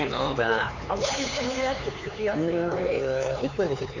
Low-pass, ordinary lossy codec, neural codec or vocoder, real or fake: 7.2 kHz; none; codec, 16 kHz, 4 kbps, X-Codec, HuBERT features, trained on LibriSpeech; fake